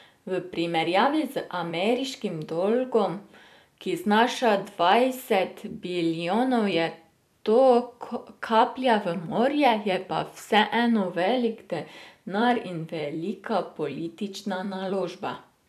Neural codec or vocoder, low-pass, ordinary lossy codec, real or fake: vocoder, 44.1 kHz, 128 mel bands every 256 samples, BigVGAN v2; 14.4 kHz; none; fake